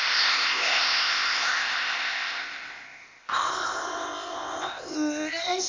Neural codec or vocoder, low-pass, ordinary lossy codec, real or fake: codec, 16 kHz, 0.8 kbps, ZipCodec; 7.2 kHz; MP3, 48 kbps; fake